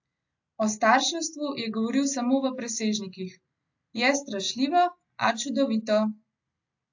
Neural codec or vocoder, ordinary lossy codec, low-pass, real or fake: none; AAC, 48 kbps; 7.2 kHz; real